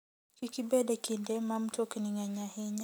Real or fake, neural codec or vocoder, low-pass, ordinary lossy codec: real; none; none; none